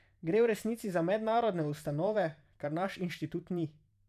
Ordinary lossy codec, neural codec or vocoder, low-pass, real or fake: none; vocoder, 44.1 kHz, 128 mel bands every 512 samples, BigVGAN v2; 14.4 kHz; fake